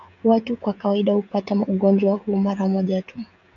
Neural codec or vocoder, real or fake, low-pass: codec, 16 kHz, 16 kbps, FreqCodec, smaller model; fake; 7.2 kHz